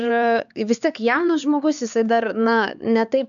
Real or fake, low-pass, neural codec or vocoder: fake; 7.2 kHz; codec, 16 kHz, 4 kbps, X-Codec, HuBERT features, trained on LibriSpeech